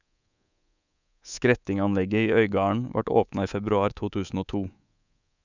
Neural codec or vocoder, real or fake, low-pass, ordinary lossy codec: codec, 24 kHz, 3.1 kbps, DualCodec; fake; 7.2 kHz; none